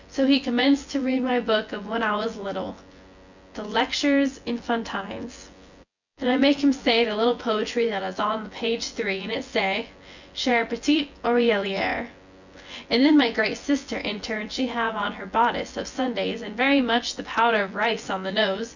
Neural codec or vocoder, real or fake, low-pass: vocoder, 24 kHz, 100 mel bands, Vocos; fake; 7.2 kHz